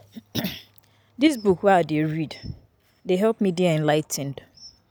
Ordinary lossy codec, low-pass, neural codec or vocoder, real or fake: none; none; none; real